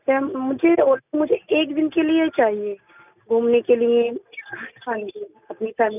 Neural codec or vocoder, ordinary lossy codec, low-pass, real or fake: none; none; 3.6 kHz; real